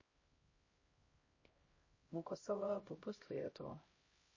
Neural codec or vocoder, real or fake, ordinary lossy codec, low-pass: codec, 16 kHz, 0.5 kbps, X-Codec, HuBERT features, trained on LibriSpeech; fake; MP3, 32 kbps; 7.2 kHz